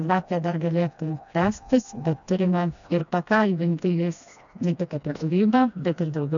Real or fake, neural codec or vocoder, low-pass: fake; codec, 16 kHz, 1 kbps, FreqCodec, smaller model; 7.2 kHz